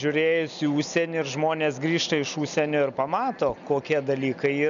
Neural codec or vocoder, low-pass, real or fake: none; 7.2 kHz; real